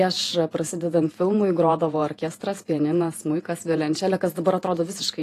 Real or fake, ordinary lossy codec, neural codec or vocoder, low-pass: fake; AAC, 48 kbps; vocoder, 48 kHz, 128 mel bands, Vocos; 14.4 kHz